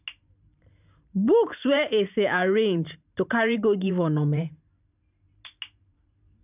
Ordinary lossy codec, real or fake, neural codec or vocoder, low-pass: none; fake; vocoder, 44.1 kHz, 128 mel bands every 512 samples, BigVGAN v2; 3.6 kHz